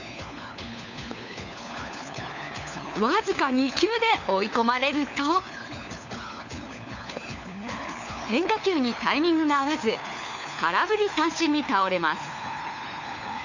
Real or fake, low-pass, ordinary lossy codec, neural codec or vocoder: fake; 7.2 kHz; none; codec, 16 kHz, 4 kbps, FunCodec, trained on LibriTTS, 50 frames a second